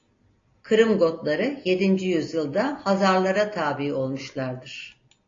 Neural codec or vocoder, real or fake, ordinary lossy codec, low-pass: none; real; AAC, 32 kbps; 7.2 kHz